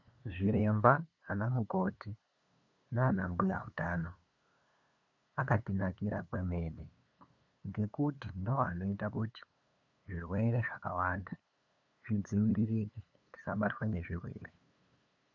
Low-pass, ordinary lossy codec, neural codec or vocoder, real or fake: 7.2 kHz; MP3, 64 kbps; codec, 16 kHz, 2 kbps, FunCodec, trained on LibriTTS, 25 frames a second; fake